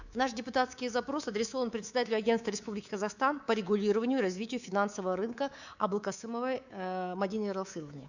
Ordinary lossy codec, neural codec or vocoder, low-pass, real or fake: none; codec, 24 kHz, 3.1 kbps, DualCodec; 7.2 kHz; fake